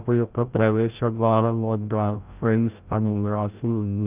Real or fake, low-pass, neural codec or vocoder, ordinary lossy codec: fake; 3.6 kHz; codec, 16 kHz, 0.5 kbps, FreqCodec, larger model; Opus, 24 kbps